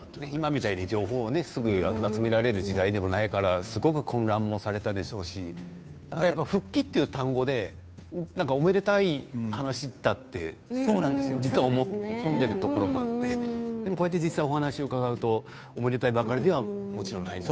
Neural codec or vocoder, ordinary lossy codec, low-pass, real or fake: codec, 16 kHz, 2 kbps, FunCodec, trained on Chinese and English, 25 frames a second; none; none; fake